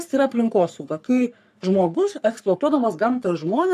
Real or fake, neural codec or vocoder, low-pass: fake; codec, 44.1 kHz, 3.4 kbps, Pupu-Codec; 14.4 kHz